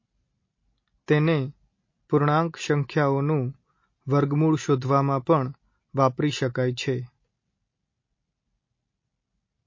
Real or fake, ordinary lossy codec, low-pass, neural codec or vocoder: real; MP3, 32 kbps; 7.2 kHz; none